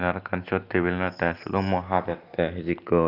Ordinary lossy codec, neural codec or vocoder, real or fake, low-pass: Opus, 32 kbps; none; real; 5.4 kHz